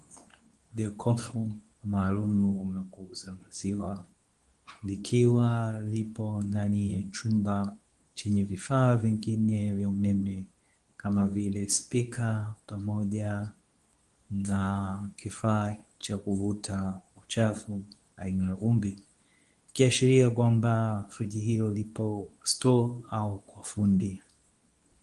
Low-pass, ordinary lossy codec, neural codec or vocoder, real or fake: 10.8 kHz; Opus, 24 kbps; codec, 24 kHz, 0.9 kbps, WavTokenizer, medium speech release version 2; fake